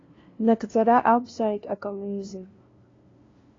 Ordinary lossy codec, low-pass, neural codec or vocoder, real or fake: AAC, 32 kbps; 7.2 kHz; codec, 16 kHz, 0.5 kbps, FunCodec, trained on LibriTTS, 25 frames a second; fake